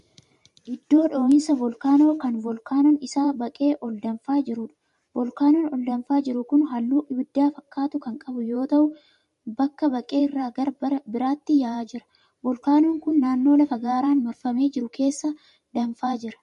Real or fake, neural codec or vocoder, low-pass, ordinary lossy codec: fake; vocoder, 44.1 kHz, 128 mel bands every 512 samples, BigVGAN v2; 14.4 kHz; MP3, 48 kbps